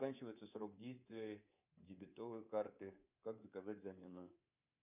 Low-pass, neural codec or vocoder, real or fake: 3.6 kHz; codec, 24 kHz, 3.1 kbps, DualCodec; fake